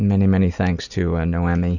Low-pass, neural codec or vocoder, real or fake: 7.2 kHz; none; real